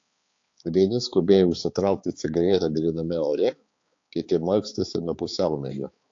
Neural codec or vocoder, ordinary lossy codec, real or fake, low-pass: codec, 16 kHz, 4 kbps, X-Codec, HuBERT features, trained on balanced general audio; AAC, 48 kbps; fake; 7.2 kHz